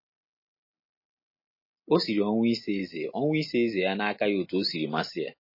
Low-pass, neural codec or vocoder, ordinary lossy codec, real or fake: 5.4 kHz; none; MP3, 24 kbps; real